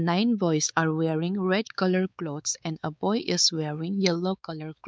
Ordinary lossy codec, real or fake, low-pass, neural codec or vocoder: none; fake; none; codec, 16 kHz, 4 kbps, X-Codec, WavLM features, trained on Multilingual LibriSpeech